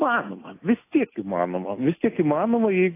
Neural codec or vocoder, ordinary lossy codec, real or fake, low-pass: none; AAC, 24 kbps; real; 3.6 kHz